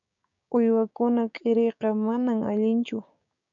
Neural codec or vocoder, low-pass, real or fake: codec, 16 kHz, 6 kbps, DAC; 7.2 kHz; fake